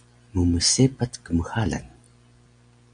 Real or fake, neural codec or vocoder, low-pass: real; none; 9.9 kHz